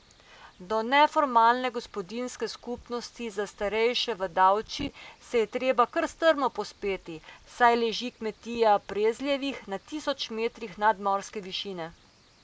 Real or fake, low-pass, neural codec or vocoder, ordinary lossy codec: real; none; none; none